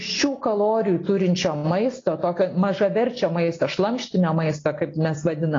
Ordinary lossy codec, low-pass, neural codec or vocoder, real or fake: AAC, 32 kbps; 7.2 kHz; none; real